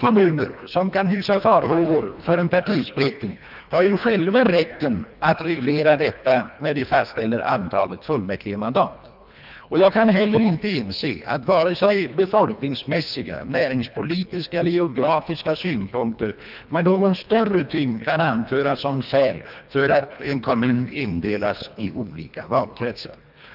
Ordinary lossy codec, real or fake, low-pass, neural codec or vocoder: none; fake; 5.4 kHz; codec, 24 kHz, 1.5 kbps, HILCodec